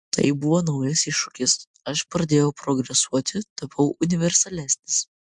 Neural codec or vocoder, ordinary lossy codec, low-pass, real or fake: none; MP3, 64 kbps; 9.9 kHz; real